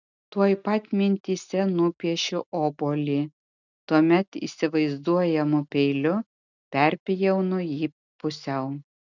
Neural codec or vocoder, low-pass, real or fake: none; 7.2 kHz; real